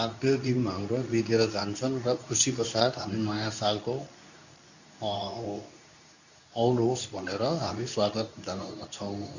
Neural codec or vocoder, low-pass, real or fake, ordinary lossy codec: codec, 24 kHz, 0.9 kbps, WavTokenizer, medium speech release version 2; 7.2 kHz; fake; none